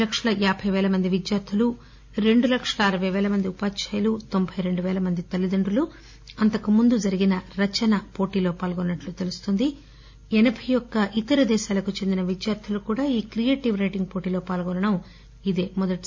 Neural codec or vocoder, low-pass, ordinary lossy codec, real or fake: none; 7.2 kHz; MP3, 64 kbps; real